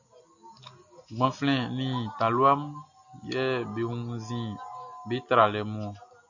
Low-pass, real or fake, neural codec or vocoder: 7.2 kHz; real; none